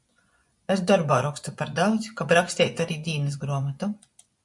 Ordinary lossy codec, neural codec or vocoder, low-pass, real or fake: MP3, 64 kbps; vocoder, 24 kHz, 100 mel bands, Vocos; 10.8 kHz; fake